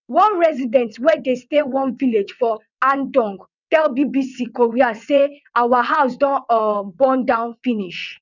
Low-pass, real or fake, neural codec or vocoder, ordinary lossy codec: 7.2 kHz; fake; vocoder, 22.05 kHz, 80 mel bands, WaveNeXt; none